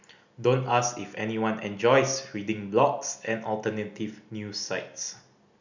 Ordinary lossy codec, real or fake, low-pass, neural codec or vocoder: none; real; 7.2 kHz; none